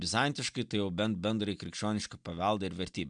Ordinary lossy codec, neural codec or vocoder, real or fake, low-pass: MP3, 96 kbps; none; real; 9.9 kHz